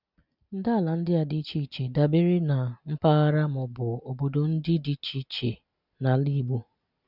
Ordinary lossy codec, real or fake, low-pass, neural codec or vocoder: none; real; 5.4 kHz; none